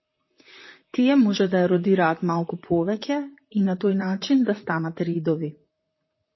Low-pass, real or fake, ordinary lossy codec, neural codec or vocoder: 7.2 kHz; fake; MP3, 24 kbps; vocoder, 44.1 kHz, 128 mel bands, Pupu-Vocoder